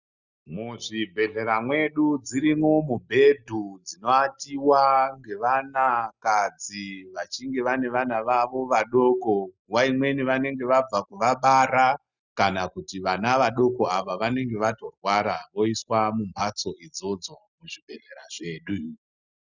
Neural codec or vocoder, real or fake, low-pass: none; real; 7.2 kHz